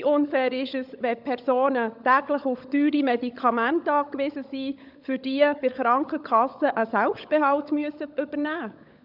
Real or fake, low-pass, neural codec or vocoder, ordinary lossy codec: fake; 5.4 kHz; codec, 16 kHz, 16 kbps, FunCodec, trained on LibriTTS, 50 frames a second; none